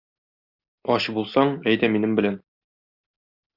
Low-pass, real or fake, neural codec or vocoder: 5.4 kHz; real; none